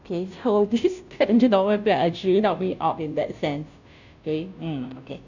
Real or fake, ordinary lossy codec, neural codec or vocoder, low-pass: fake; none; codec, 16 kHz, 0.5 kbps, FunCodec, trained on Chinese and English, 25 frames a second; 7.2 kHz